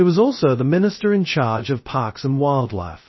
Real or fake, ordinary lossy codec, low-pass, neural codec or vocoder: fake; MP3, 24 kbps; 7.2 kHz; codec, 16 kHz, 0.2 kbps, FocalCodec